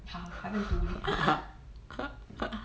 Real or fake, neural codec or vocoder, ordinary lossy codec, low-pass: real; none; none; none